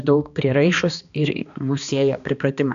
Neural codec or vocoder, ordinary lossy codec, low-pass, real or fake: codec, 16 kHz, 2 kbps, X-Codec, HuBERT features, trained on balanced general audio; AAC, 96 kbps; 7.2 kHz; fake